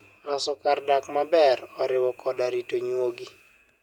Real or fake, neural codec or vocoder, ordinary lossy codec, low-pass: fake; vocoder, 48 kHz, 128 mel bands, Vocos; none; 19.8 kHz